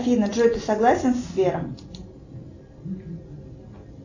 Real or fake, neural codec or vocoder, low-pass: real; none; 7.2 kHz